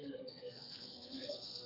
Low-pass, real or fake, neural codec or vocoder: 5.4 kHz; fake; codec, 32 kHz, 1.9 kbps, SNAC